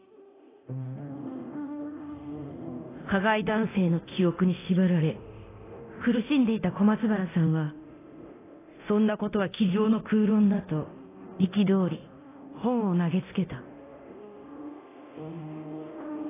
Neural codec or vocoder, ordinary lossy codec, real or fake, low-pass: codec, 24 kHz, 0.9 kbps, DualCodec; AAC, 16 kbps; fake; 3.6 kHz